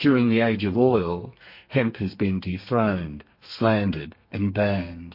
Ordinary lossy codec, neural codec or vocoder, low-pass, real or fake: MP3, 32 kbps; codec, 32 kHz, 1.9 kbps, SNAC; 5.4 kHz; fake